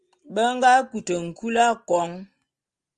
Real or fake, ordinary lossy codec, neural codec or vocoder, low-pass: real; Opus, 24 kbps; none; 10.8 kHz